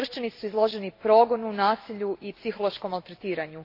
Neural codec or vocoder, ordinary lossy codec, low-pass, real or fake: none; AAC, 32 kbps; 5.4 kHz; real